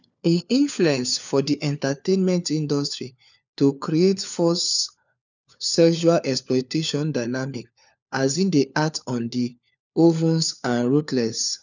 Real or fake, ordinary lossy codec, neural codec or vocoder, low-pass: fake; none; codec, 16 kHz, 4 kbps, FunCodec, trained on LibriTTS, 50 frames a second; 7.2 kHz